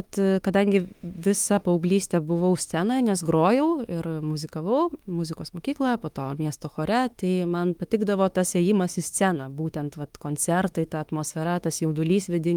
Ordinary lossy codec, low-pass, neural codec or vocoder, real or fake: Opus, 32 kbps; 19.8 kHz; autoencoder, 48 kHz, 32 numbers a frame, DAC-VAE, trained on Japanese speech; fake